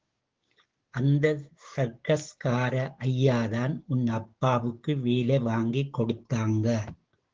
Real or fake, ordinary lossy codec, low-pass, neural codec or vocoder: fake; Opus, 16 kbps; 7.2 kHz; codec, 16 kHz, 6 kbps, DAC